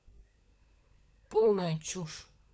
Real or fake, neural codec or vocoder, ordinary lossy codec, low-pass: fake; codec, 16 kHz, 16 kbps, FunCodec, trained on LibriTTS, 50 frames a second; none; none